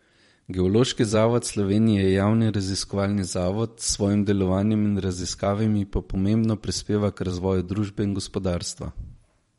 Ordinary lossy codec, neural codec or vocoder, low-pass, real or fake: MP3, 48 kbps; vocoder, 44.1 kHz, 128 mel bands every 512 samples, BigVGAN v2; 19.8 kHz; fake